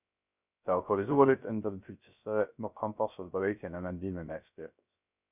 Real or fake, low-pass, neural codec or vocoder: fake; 3.6 kHz; codec, 16 kHz, 0.3 kbps, FocalCodec